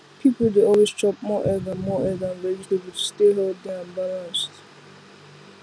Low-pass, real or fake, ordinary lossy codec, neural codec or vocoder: none; real; none; none